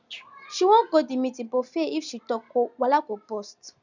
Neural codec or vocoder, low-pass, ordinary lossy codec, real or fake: none; 7.2 kHz; none; real